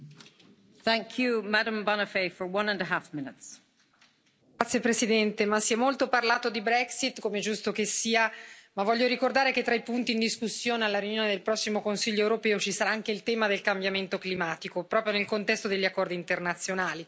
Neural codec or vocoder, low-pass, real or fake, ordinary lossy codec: none; none; real; none